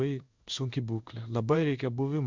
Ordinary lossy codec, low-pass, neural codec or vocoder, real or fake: Opus, 64 kbps; 7.2 kHz; codec, 16 kHz in and 24 kHz out, 1 kbps, XY-Tokenizer; fake